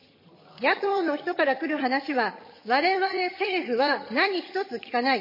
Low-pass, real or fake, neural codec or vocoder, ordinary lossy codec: 5.4 kHz; fake; vocoder, 22.05 kHz, 80 mel bands, HiFi-GAN; MP3, 24 kbps